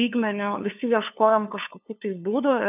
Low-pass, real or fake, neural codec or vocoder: 3.6 kHz; fake; codec, 16 kHz, 2 kbps, FunCodec, trained on LibriTTS, 25 frames a second